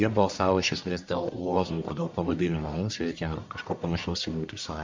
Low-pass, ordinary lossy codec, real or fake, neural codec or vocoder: 7.2 kHz; MP3, 64 kbps; fake; codec, 44.1 kHz, 1.7 kbps, Pupu-Codec